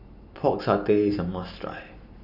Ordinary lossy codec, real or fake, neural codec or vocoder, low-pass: none; real; none; 5.4 kHz